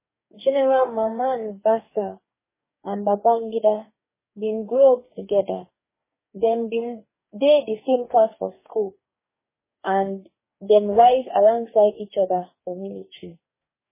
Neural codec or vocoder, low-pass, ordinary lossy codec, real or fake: codec, 44.1 kHz, 2.6 kbps, DAC; 3.6 kHz; MP3, 16 kbps; fake